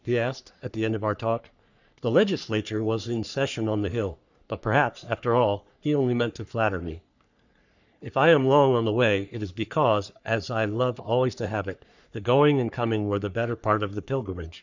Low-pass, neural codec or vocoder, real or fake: 7.2 kHz; codec, 44.1 kHz, 3.4 kbps, Pupu-Codec; fake